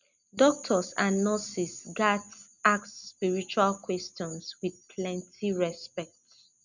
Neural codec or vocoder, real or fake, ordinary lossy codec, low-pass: none; real; none; 7.2 kHz